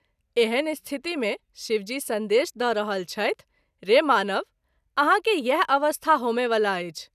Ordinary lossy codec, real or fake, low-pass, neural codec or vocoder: none; real; 14.4 kHz; none